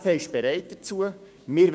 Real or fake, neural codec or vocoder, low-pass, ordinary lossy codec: fake; codec, 16 kHz, 6 kbps, DAC; none; none